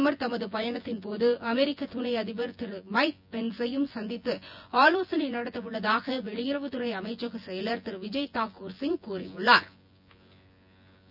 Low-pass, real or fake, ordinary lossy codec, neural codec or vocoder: 5.4 kHz; fake; none; vocoder, 24 kHz, 100 mel bands, Vocos